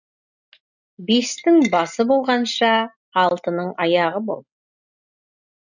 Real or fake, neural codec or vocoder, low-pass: real; none; 7.2 kHz